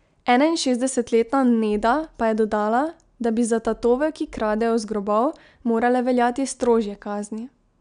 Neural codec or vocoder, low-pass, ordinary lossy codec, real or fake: none; 9.9 kHz; none; real